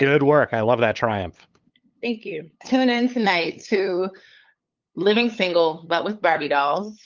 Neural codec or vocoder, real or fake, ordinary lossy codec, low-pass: codec, 16 kHz, 8 kbps, FunCodec, trained on LibriTTS, 25 frames a second; fake; Opus, 32 kbps; 7.2 kHz